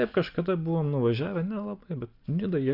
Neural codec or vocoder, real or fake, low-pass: none; real; 5.4 kHz